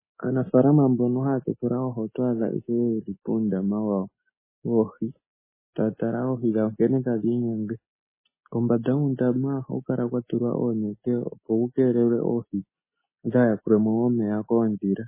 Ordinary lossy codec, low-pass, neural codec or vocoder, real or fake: MP3, 16 kbps; 3.6 kHz; none; real